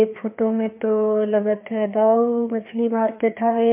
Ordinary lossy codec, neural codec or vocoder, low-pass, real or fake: MP3, 24 kbps; codec, 16 kHz, 2 kbps, FreqCodec, larger model; 3.6 kHz; fake